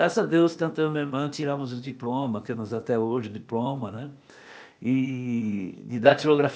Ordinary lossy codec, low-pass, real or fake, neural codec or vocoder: none; none; fake; codec, 16 kHz, 0.8 kbps, ZipCodec